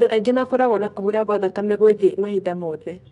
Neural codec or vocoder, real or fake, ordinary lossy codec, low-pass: codec, 24 kHz, 0.9 kbps, WavTokenizer, medium music audio release; fake; none; 10.8 kHz